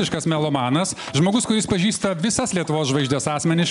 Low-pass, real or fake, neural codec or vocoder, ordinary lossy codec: 10.8 kHz; real; none; MP3, 96 kbps